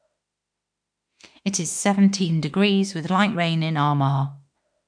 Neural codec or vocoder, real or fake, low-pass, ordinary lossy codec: autoencoder, 48 kHz, 32 numbers a frame, DAC-VAE, trained on Japanese speech; fake; 9.9 kHz; MP3, 64 kbps